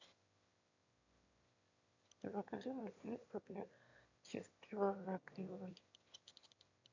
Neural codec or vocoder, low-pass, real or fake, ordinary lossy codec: autoencoder, 22.05 kHz, a latent of 192 numbers a frame, VITS, trained on one speaker; 7.2 kHz; fake; AAC, 48 kbps